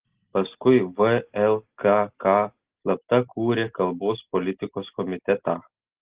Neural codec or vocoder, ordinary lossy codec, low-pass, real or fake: none; Opus, 16 kbps; 3.6 kHz; real